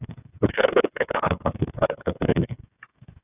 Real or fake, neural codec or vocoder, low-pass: fake; codec, 16 kHz, 8 kbps, FreqCodec, smaller model; 3.6 kHz